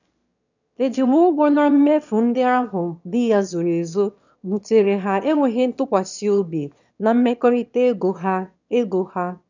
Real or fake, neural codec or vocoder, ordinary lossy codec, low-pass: fake; autoencoder, 22.05 kHz, a latent of 192 numbers a frame, VITS, trained on one speaker; none; 7.2 kHz